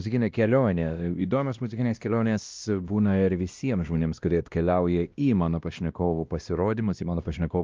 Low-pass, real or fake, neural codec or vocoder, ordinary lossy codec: 7.2 kHz; fake; codec, 16 kHz, 1 kbps, X-Codec, WavLM features, trained on Multilingual LibriSpeech; Opus, 24 kbps